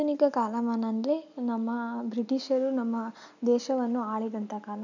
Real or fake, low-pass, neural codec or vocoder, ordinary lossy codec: real; 7.2 kHz; none; none